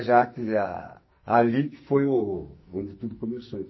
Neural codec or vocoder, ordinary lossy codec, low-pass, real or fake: codec, 44.1 kHz, 2.6 kbps, SNAC; MP3, 24 kbps; 7.2 kHz; fake